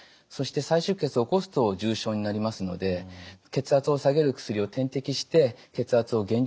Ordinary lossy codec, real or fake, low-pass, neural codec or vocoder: none; real; none; none